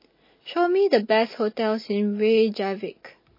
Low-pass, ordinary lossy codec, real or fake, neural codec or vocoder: 5.4 kHz; MP3, 24 kbps; real; none